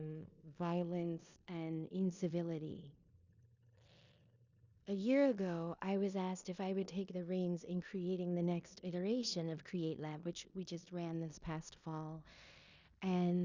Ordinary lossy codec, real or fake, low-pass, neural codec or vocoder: Opus, 64 kbps; fake; 7.2 kHz; codec, 16 kHz in and 24 kHz out, 0.9 kbps, LongCat-Audio-Codec, four codebook decoder